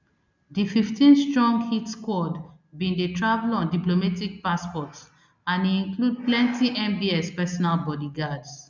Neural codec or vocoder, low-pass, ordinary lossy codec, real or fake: none; 7.2 kHz; none; real